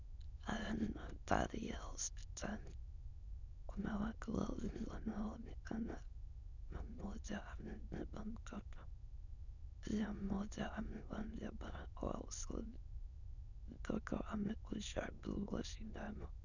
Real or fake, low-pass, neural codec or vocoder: fake; 7.2 kHz; autoencoder, 22.05 kHz, a latent of 192 numbers a frame, VITS, trained on many speakers